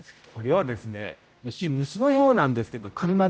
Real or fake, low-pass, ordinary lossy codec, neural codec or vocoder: fake; none; none; codec, 16 kHz, 0.5 kbps, X-Codec, HuBERT features, trained on general audio